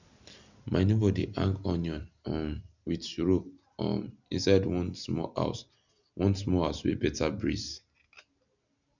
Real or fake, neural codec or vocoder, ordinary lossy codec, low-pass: real; none; none; 7.2 kHz